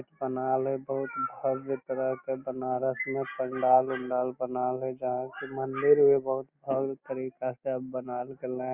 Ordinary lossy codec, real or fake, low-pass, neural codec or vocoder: Opus, 64 kbps; real; 3.6 kHz; none